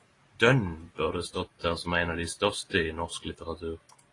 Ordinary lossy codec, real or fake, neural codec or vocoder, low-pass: AAC, 32 kbps; real; none; 10.8 kHz